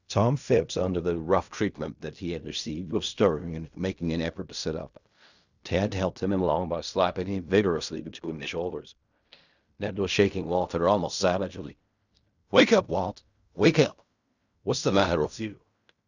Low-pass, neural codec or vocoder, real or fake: 7.2 kHz; codec, 16 kHz in and 24 kHz out, 0.4 kbps, LongCat-Audio-Codec, fine tuned four codebook decoder; fake